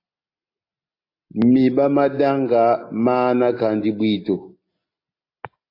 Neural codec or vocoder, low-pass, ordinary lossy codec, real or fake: none; 5.4 kHz; AAC, 32 kbps; real